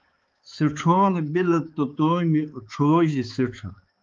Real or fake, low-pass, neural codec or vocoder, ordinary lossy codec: fake; 7.2 kHz; codec, 16 kHz, 4 kbps, X-Codec, HuBERT features, trained on balanced general audio; Opus, 32 kbps